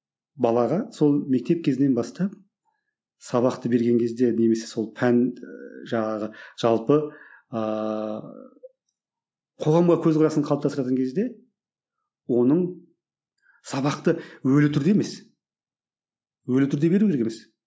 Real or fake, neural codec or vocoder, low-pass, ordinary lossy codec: real; none; none; none